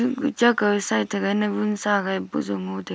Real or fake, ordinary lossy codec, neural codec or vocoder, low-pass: real; none; none; none